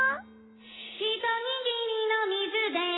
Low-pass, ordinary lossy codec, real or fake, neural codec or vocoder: 7.2 kHz; AAC, 16 kbps; real; none